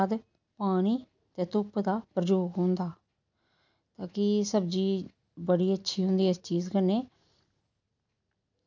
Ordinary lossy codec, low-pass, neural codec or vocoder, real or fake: none; 7.2 kHz; none; real